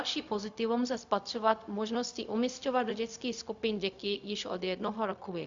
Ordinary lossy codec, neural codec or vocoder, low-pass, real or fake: Opus, 64 kbps; codec, 16 kHz, 0.4 kbps, LongCat-Audio-Codec; 7.2 kHz; fake